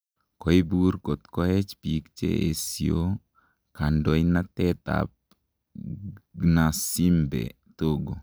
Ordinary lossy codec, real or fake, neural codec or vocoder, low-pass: none; real; none; none